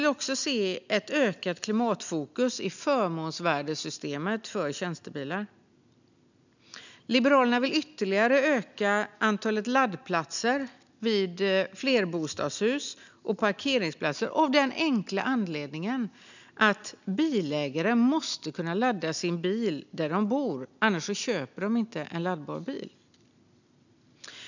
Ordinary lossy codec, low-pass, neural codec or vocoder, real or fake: none; 7.2 kHz; none; real